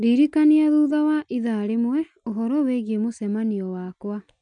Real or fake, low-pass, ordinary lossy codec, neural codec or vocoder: real; 9.9 kHz; none; none